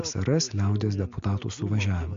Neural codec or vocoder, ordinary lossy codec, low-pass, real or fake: none; MP3, 48 kbps; 7.2 kHz; real